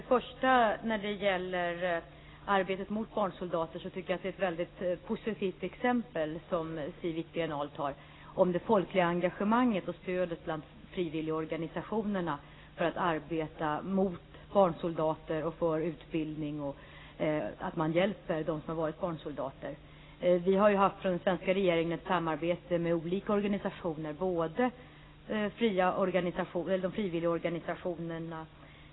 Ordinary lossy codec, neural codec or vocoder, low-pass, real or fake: AAC, 16 kbps; none; 7.2 kHz; real